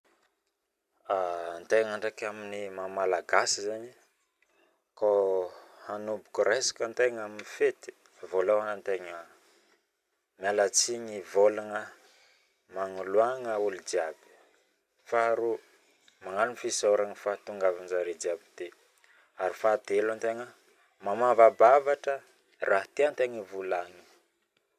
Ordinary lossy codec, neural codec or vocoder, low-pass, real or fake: none; none; 14.4 kHz; real